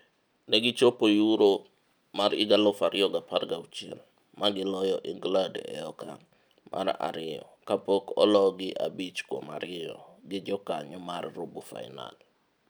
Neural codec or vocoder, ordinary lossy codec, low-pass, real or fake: none; none; none; real